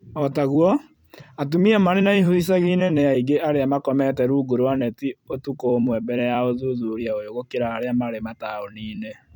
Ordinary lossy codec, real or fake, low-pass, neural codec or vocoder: none; fake; 19.8 kHz; vocoder, 44.1 kHz, 128 mel bands every 256 samples, BigVGAN v2